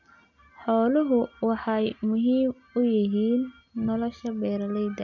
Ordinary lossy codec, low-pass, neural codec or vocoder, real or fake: none; 7.2 kHz; none; real